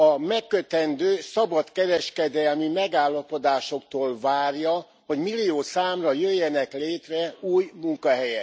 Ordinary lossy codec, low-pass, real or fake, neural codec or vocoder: none; none; real; none